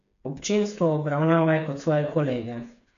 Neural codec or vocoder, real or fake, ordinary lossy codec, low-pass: codec, 16 kHz, 4 kbps, FreqCodec, smaller model; fake; none; 7.2 kHz